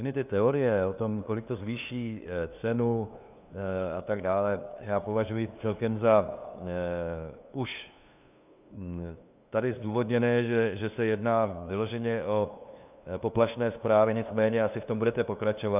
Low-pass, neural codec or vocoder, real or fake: 3.6 kHz; codec, 16 kHz, 2 kbps, FunCodec, trained on LibriTTS, 25 frames a second; fake